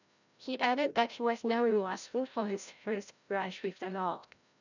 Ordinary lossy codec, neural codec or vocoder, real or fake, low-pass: none; codec, 16 kHz, 0.5 kbps, FreqCodec, larger model; fake; 7.2 kHz